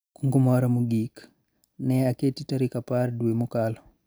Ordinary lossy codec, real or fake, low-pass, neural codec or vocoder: none; real; none; none